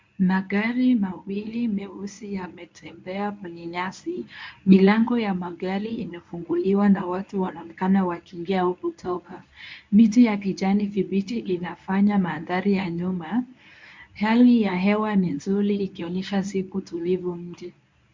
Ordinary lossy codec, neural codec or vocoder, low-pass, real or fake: MP3, 64 kbps; codec, 24 kHz, 0.9 kbps, WavTokenizer, medium speech release version 1; 7.2 kHz; fake